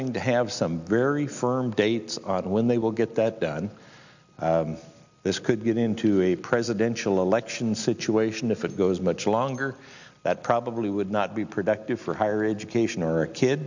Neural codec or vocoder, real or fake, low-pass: none; real; 7.2 kHz